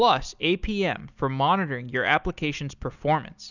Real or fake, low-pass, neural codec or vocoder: real; 7.2 kHz; none